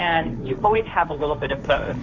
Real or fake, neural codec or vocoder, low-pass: fake; codec, 16 kHz, 1.1 kbps, Voila-Tokenizer; 7.2 kHz